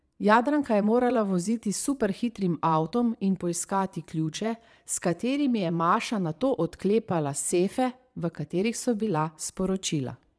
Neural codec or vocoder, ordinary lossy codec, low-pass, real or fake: vocoder, 22.05 kHz, 80 mel bands, Vocos; none; none; fake